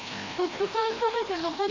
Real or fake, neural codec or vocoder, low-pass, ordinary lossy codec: fake; codec, 16 kHz, 1 kbps, FreqCodec, larger model; 7.2 kHz; MP3, 32 kbps